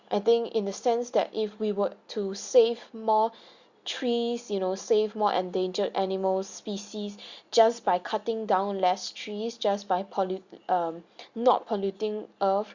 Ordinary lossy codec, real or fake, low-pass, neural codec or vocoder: Opus, 64 kbps; real; 7.2 kHz; none